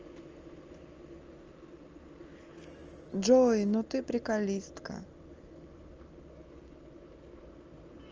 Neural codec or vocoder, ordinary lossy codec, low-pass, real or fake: none; Opus, 24 kbps; 7.2 kHz; real